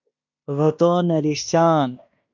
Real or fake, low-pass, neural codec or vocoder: fake; 7.2 kHz; codec, 16 kHz in and 24 kHz out, 0.9 kbps, LongCat-Audio-Codec, fine tuned four codebook decoder